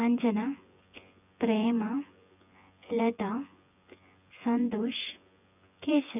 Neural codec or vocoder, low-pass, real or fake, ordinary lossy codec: vocoder, 24 kHz, 100 mel bands, Vocos; 3.6 kHz; fake; none